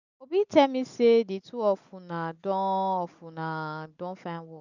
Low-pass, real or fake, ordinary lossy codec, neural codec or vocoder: 7.2 kHz; real; none; none